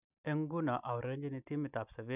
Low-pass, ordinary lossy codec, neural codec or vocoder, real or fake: 3.6 kHz; none; none; real